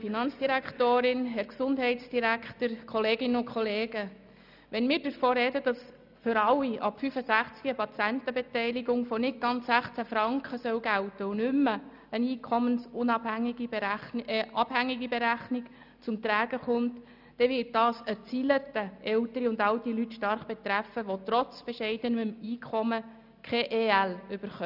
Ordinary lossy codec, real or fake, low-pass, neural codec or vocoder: none; real; 5.4 kHz; none